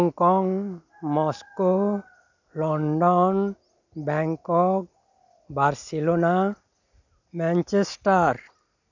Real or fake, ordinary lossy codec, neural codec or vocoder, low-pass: real; none; none; 7.2 kHz